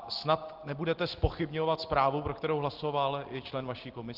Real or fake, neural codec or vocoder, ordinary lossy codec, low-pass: real; none; Opus, 16 kbps; 5.4 kHz